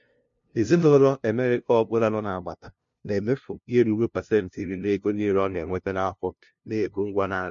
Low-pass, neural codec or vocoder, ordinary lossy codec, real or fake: 7.2 kHz; codec, 16 kHz, 0.5 kbps, FunCodec, trained on LibriTTS, 25 frames a second; MP3, 48 kbps; fake